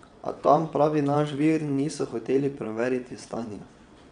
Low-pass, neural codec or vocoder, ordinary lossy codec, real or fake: 9.9 kHz; vocoder, 22.05 kHz, 80 mel bands, WaveNeXt; none; fake